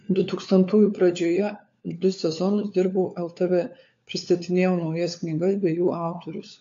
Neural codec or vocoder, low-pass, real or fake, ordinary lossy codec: codec, 16 kHz, 4 kbps, FunCodec, trained on LibriTTS, 50 frames a second; 7.2 kHz; fake; AAC, 48 kbps